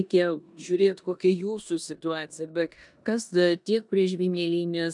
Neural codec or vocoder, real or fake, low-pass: codec, 16 kHz in and 24 kHz out, 0.9 kbps, LongCat-Audio-Codec, four codebook decoder; fake; 10.8 kHz